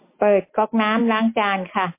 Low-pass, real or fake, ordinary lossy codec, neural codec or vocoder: 3.6 kHz; real; MP3, 24 kbps; none